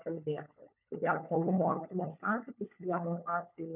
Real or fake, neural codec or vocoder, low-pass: fake; codec, 16 kHz, 16 kbps, FunCodec, trained on LibriTTS, 50 frames a second; 3.6 kHz